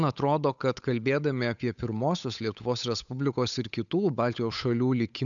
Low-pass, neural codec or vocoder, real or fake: 7.2 kHz; codec, 16 kHz, 8 kbps, FunCodec, trained on Chinese and English, 25 frames a second; fake